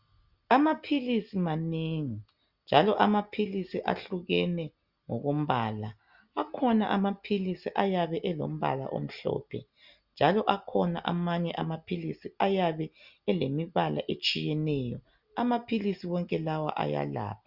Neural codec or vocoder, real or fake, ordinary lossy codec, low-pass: none; real; Opus, 64 kbps; 5.4 kHz